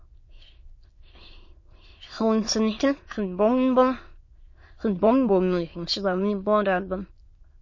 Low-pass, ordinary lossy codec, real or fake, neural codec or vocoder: 7.2 kHz; MP3, 32 kbps; fake; autoencoder, 22.05 kHz, a latent of 192 numbers a frame, VITS, trained on many speakers